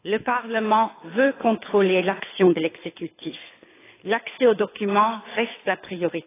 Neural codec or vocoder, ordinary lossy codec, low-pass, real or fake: codec, 24 kHz, 6 kbps, HILCodec; AAC, 16 kbps; 3.6 kHz; fake